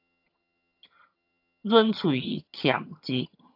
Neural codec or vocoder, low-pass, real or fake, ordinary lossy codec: vocoder, 22.05 kHz, 80 mel bands, HiFi-GAN; 5.4 kHz; fake; AAC, 48 kbps